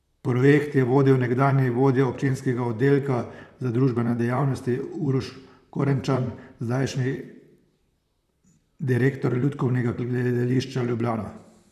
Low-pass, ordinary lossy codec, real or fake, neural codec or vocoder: 14.4 kHz; none; fake; vocoder, 44.1 kHz, 128 mel bands, Pupu-Vocoder